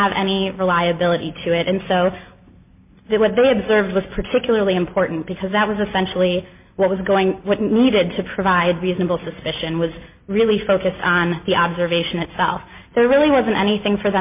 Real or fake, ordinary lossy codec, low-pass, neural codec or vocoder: real; MP3, 32 kbps; 3.6 kHz; none